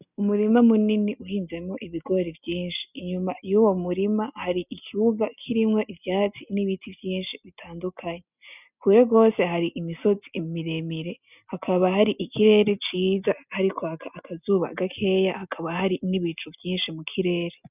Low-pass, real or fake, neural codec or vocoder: 3.6 kHz; real; none